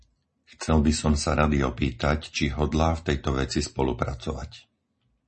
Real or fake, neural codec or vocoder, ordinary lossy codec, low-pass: real; none; MP3, 32 kbps; 10.8 kHz